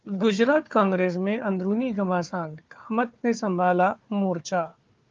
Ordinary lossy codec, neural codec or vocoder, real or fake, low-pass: Opus, 32 kbps; codec, 16 kHz, 4 kbps, FunCodec, trained on Chinese and English, 50 frames a second; fake; 7.2 kHz